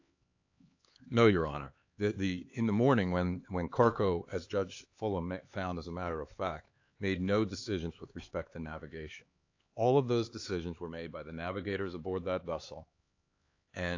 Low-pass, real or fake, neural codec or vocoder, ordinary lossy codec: 7.2 kHz; fake; codec, 16 kHz, 4 kbps, X-Codec, HuBERT features, trained on LibriSpeech; AAC, 48 kbps